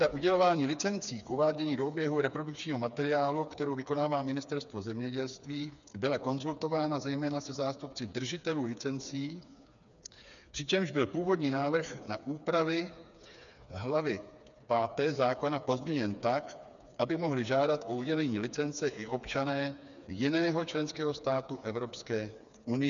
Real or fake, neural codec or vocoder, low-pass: fake; codec, 16 kHz, 4 kbps, FreqCodec, smaller model; 7.2 kHz